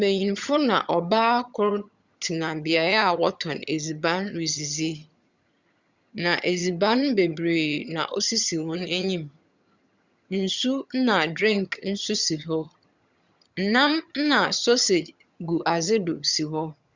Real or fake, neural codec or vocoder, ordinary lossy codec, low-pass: fake; vocoder, 22.05 kHz, 80 mel bands, HiFi-GAN; Opus, 64 kbps; 7.2 kHz